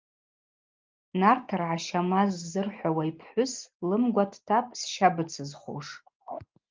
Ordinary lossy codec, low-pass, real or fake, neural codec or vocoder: Opus, 32 kbps; 7.2 kHz; real; none